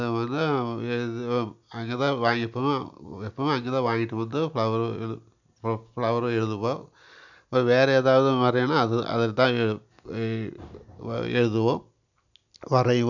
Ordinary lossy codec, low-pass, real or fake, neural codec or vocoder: none; 7.2 kHz; real; none